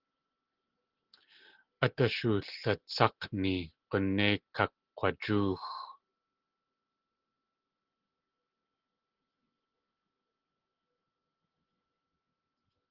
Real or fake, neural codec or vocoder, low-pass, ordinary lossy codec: real; none; 5.4 kHz; Opus, 16 kbps